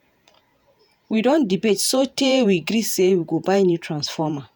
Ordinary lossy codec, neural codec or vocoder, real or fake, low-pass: none; vocoder, 48 kHz, 128 mel bands, Vocos; fake; none